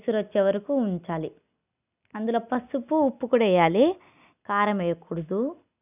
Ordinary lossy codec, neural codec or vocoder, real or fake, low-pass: none; none; real; 3.6 kHz